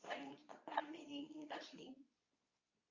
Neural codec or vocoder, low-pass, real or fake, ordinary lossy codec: codec, 24 kHz, 0.9 kbps, WavTokenizer, medium speech release version 2; 7.2 kHz; fake; Opus, 64 kbps